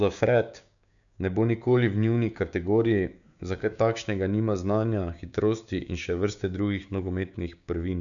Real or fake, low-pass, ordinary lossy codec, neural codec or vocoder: fake; 7.2 kHz; none; codec, 16 kHz, 6 kbps, DAC